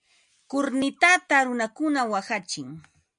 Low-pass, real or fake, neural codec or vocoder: 9.9 kHz; real; none